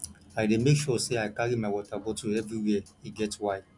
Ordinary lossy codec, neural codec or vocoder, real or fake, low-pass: none; none; real; 10.8 kHz